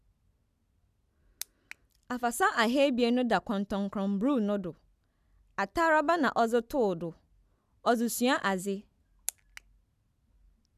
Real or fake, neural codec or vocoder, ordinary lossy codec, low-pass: real; none; none; 14.4 kHz